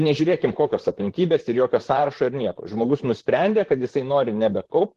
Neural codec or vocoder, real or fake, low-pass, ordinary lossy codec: vocoder, 44.1 kHz, 128 mel bands, Pupu-Vocoder; fake; 14.4 kHz; Opus, 16 kbps